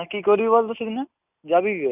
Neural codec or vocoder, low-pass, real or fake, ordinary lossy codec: none; 3.6 kHz; real; none